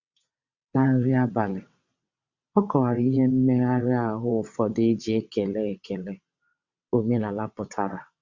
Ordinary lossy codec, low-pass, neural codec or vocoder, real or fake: Opus, 64 kbps; 7.2 kHz; vocoder, 22.05 kHz, 80 mel bands, WaveNeXt; fake